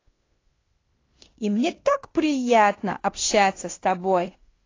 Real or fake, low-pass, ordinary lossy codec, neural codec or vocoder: fake; 7.2 kHz; AAC, 32 kbps; codec, 16 kHz in and 24 kHz out, 0.9 kbps, LongCat-Audio-Codec, fine tuned four codebook decoder